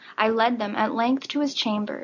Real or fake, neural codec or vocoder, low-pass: real; none; 7.2 kHz